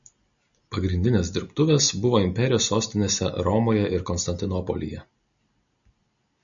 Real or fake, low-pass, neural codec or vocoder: real; 7.2 kHz; none